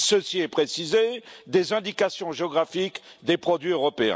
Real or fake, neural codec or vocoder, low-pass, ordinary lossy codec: real; none; none; none